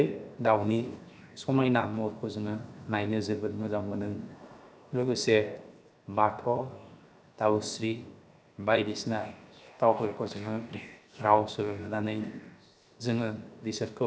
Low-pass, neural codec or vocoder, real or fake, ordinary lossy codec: none; codec, 16 kHz, 0.7 kbps, FocalCodec; fake; none